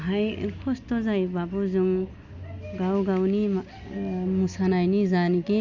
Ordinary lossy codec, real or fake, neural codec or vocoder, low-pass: none; real; none; 7.2 kHz